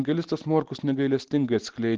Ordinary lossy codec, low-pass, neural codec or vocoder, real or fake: Opus, 16 kbps; 7.2 kHz; none; real